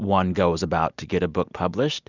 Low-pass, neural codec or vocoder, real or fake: 7.2 kHz; none; real